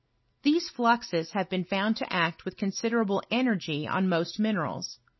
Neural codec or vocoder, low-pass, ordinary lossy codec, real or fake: none; 7.2 kHz; MP3, 24 kbps; real